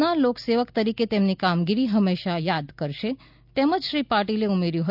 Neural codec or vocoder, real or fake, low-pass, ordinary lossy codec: none; real; 5.4 kHz; none